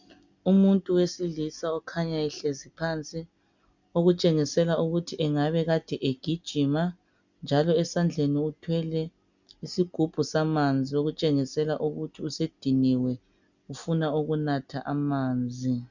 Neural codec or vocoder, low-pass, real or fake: none; 7.2 kHz; real